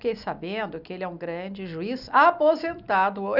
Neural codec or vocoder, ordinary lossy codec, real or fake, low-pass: none; none; real; 5.4 kHz